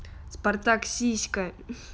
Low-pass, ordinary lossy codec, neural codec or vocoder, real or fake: none; none; none; real